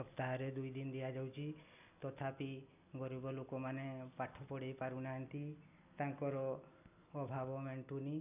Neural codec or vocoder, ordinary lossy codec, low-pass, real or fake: none; none; 3.6 kHz; real